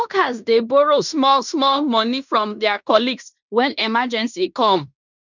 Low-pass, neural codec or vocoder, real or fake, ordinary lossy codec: 7.2 kHz; codec, 16 kHz in and 24 kHz out, 0.9 kbps, LongCat-Audio-Codec, fine tuned four codebook decoder; fake; none